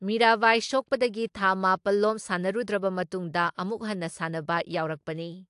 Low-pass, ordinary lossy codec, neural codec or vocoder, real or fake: 10.8 kHz; AAC, 64 kbps; none; real